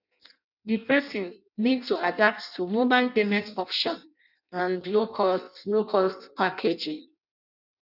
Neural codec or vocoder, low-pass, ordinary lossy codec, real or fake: codec, 16 kHz in and 24 kHz out, 0.6 kbps, FireRedTTS-2 codec; 5.4 kHz; none; fake